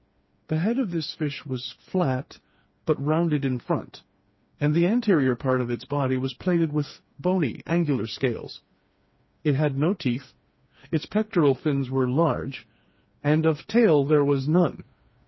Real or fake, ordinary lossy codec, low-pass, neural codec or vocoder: fake; MP3, 24 kbps; 7.2 kHz; codec, 16 kHz, 4 kbps, FreqCodec, smaller model